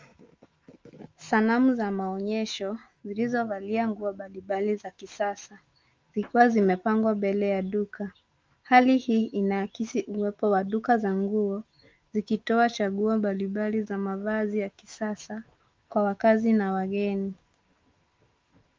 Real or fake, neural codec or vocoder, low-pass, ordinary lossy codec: fake; autoencoder, 48 kHz, 128 numbers a frame, DAC-VAE, trained on Japanese speech; 7.2 kHz; Opus, 32 kbps